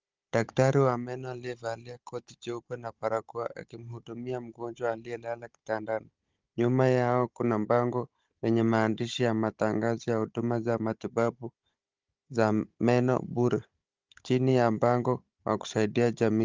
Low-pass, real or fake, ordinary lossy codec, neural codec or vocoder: 7.2 kHz; fake; Opus, 32 kbps; codec, 16 kHz, 16 kbps, FunCodec, trained on Chinese and English, 50 frames a second